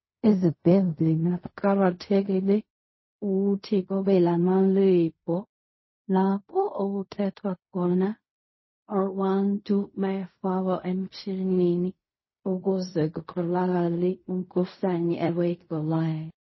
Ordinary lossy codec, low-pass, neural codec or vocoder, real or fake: MP3, 24 kbps; 7.2 kHz; codec, 16 kHz in and 24 kHz out, 0.4 kbps, LongCat-Audio-Codec, fine tuned four codebook decoder; fake